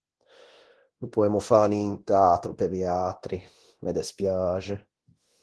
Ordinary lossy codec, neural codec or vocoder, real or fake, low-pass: Opus, 16 kbps; codec, 24 kHz, 0.9 kbps, DualCodec; fake; 10.8 kHz